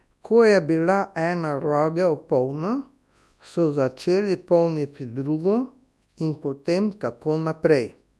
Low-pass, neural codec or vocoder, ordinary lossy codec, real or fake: none; codec, 24 kHz, 0.9 kbps, WavTokenizer, large speech release; none; fake